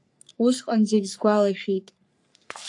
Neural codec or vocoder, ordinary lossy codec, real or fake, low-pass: codec, 44.1 kHz, 3.4 kbps, Pupu-Codec; AAC, 64 kbps; fake; 10.8 kHz